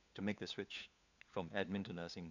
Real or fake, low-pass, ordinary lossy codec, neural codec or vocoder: fake; 7.2 kHz; none; codec, 16 kHz, 4 kbps, FunCodec, trained on LibriTTS, 50 frames a second